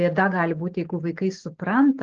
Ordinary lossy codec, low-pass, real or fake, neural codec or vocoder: Opus, 16 kbps; 7.2 kHz; real; none